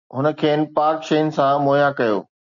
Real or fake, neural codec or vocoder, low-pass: real; none; 7.2 kHz